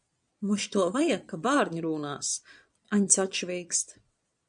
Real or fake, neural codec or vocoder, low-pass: fake; vocoder, 22.05 kHz, 80 mel bands, Vocos; 9.9 kHz